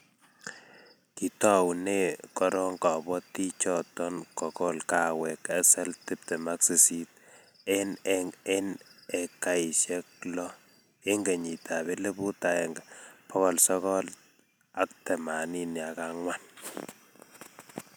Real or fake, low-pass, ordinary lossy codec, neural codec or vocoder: real; none; none; none